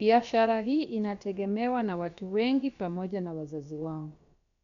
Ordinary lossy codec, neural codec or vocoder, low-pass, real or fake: none; codec, 16 kHz, about 1 kbps, DyCAST, with the encoder's durations; 7.2 kHz; fake